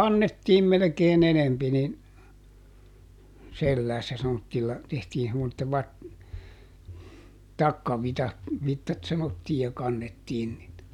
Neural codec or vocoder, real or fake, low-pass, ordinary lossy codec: none; real; 19.8 kHz; none